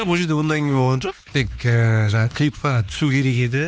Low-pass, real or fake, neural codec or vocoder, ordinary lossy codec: none; fake; codec, 16 kHz, 2 kbps, X-Codec, HuBERT features, trained on LibriSpeech; none